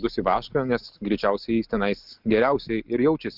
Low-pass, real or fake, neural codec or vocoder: 5.4 kHz; real; none